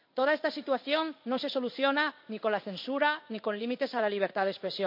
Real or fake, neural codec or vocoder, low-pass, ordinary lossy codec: fake; codec, 16 kHz in and 24 kHz out, 1 kbps, XY-Tokenizer; 5.4 kHz; MP3, 32 kbps